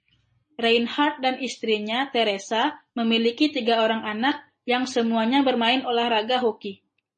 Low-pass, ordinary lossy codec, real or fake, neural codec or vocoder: 10.8 kHz; MP3, 32 kbps; real; none